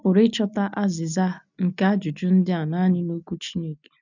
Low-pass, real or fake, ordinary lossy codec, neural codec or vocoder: 7.2 kHz; real; none; none